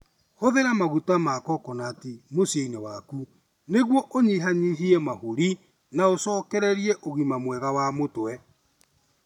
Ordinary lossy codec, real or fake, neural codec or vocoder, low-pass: none; real; none; 19.8 kHz